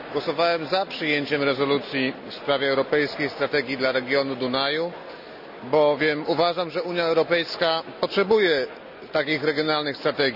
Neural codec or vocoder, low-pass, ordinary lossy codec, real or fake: none; 5.4 kHz; none; real